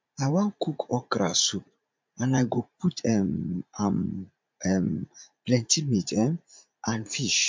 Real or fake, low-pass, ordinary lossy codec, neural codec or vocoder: fake; 7.2 kHz; MP3, 64 kbps; vocoder, 44.1 kHz, 80 mel bands, Vocos